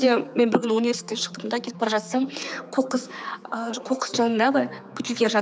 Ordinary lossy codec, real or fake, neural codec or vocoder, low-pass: none; fake; codec, 16 kHz, 4 kbps, X-Codec, HuBERT features, trained on general audio; none